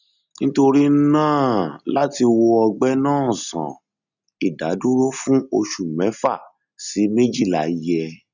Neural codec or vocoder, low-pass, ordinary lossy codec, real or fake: none; 7.2 kHz; none; real